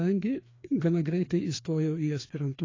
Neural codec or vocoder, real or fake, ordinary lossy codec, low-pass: codec, 16 kHz, 2 kbps, FreqCodec, larger model; fake; AAC, 32 kbps; 7.2 kHz